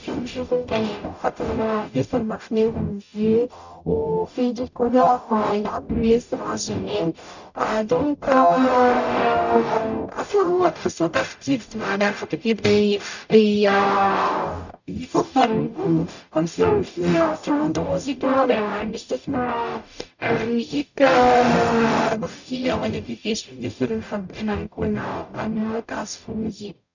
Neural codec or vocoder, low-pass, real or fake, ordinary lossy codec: codec, 44.1 kHz, 0.9 kbps, DAC; 7.2 kHz; fake; none